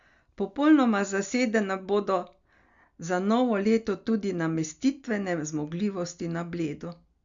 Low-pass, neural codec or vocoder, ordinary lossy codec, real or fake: 7.2 kHz; none; Opus, 64 kbps; real